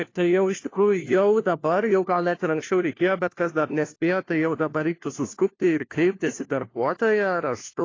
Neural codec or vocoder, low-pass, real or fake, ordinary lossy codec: codec, 16 kHz, 1 kbps, FunCodec, trained on LibriTTS, 50 frames a second; 7.2 kHz; fake; AAC, 32 kbps